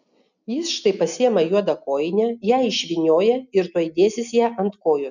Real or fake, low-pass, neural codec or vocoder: real; 7.2 kHz; none